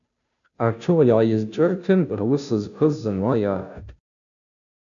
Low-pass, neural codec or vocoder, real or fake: 7.2 kHz; codec, 16 kHz, 0.5 kbps, FunCodec, trained on Chinese and English, 25 frames a second; fake